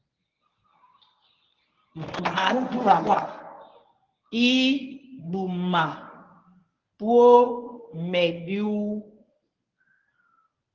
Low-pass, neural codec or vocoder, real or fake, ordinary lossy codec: 7.2 kHz; codec, 24 kHz, 0.9 kbps, WavTokenizer, medium speech release version 1; fake; Opus, 32 kbps